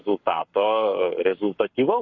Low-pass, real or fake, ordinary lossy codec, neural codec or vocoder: 7.2 kHz; fake; MP3, 48 kbps; codec, 44.1 kHz, 7.8 kbps, Pupu-Codec